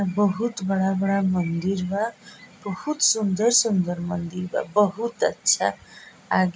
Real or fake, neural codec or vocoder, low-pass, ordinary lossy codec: real; none; none; none